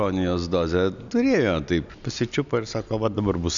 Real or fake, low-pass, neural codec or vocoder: real; 7.2 kHz; none